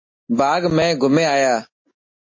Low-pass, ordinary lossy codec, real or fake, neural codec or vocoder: 7.2 kHz; MP3, 32 kbps; real; none